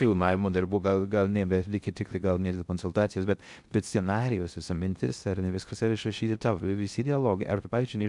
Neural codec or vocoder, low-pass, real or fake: codec, 16 kHz in and 24 kHz out, 0.6 kbps, FocalCodec, streaming, 2048 codes; 10.8 kHz; fake